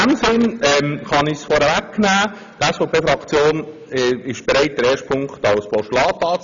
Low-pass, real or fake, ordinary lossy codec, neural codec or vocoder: 7.2 kHz; real; none; none